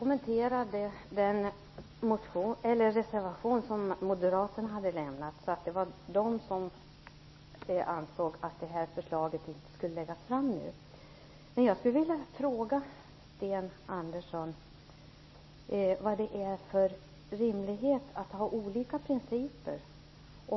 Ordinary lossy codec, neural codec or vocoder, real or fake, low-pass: MP3, 24 kbps; none; real; 7.2 kHz